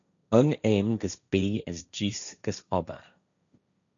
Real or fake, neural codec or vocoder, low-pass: fake; codec, 16 kHz, 1.1 kbps, Voila-Tokenizer; 7.2 kHz